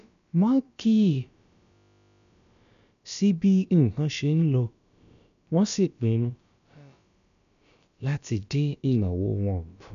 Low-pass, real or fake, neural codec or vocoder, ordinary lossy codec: 7.2 kHz; fake; codec, 16 kHz, about 1 kbps, DyCAST, with the encoder's durations; none